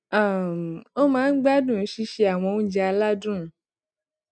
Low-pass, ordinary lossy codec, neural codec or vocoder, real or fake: 9.9 kHz; none; none; real